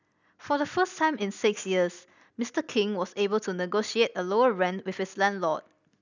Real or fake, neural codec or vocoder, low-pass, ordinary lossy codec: real; none; 7.2 kHz; none